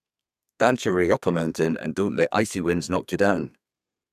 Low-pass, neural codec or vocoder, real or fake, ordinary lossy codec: 14.4 kHz; codec, 32 kHz, 1.9 kbps, SNAC; fake; none